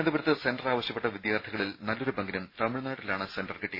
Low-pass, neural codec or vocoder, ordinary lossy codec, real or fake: 5.4 kHz; none; MP3, 24 kbps; real